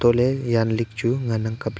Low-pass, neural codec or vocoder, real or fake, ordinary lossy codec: none; none; real; none